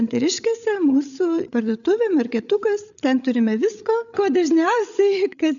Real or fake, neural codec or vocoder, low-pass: fake; codec, 16 kHz, 8 kbps, FreqCodec, larger model; 7.2 kHz